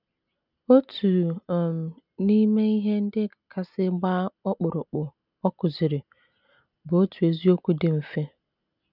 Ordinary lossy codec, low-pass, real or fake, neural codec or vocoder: none; 5.4 kHz; real; none